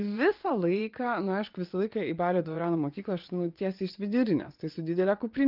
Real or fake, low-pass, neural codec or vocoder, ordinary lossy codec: fake; 5.4 kHz; vocoder, 22.05 kHz, 80 mel bands, WaveNeXt; Opus, 24 kbps